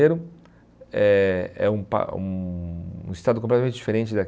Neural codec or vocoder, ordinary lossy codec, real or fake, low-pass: none; none; real; none